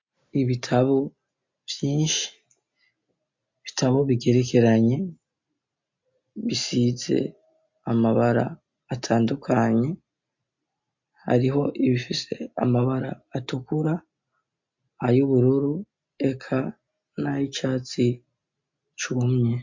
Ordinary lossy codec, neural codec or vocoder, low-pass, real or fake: MP3, 48 kbps; none; 7.2 kHz; real